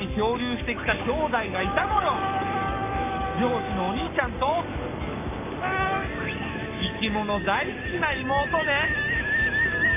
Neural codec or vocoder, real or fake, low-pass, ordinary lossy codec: codec, 44.1 kHz, 7.8 kbps, Pupu-Codec; fake; 3.6 kHz; AAC, 32 kbps